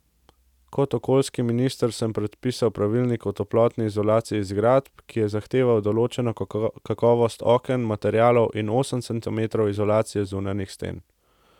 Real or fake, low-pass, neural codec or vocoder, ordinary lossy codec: real; 19.8 kHz; none; none